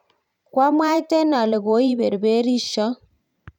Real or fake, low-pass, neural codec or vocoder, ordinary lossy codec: fake; 19.8 kHz; vocoder, 44.1 kHz, 128 mel bands every 512 samples, BigVGAN v2; none